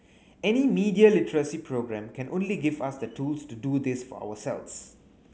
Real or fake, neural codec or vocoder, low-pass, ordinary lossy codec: real; none; none; none